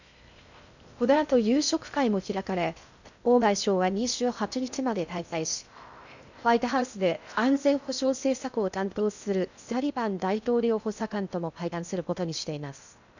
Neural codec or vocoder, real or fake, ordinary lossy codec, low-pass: codec, 16 kHz in and 24 kHz out, 0.6 kbps, FocalCodec, streaming, 2048 codes; fake; none; 7.2 kHz